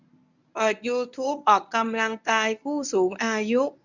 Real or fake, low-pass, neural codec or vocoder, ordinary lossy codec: fake; 7.2 kHz; codec, 24 kHz, 0.9 kbps, WavTokenizer, medium speech release version 1; none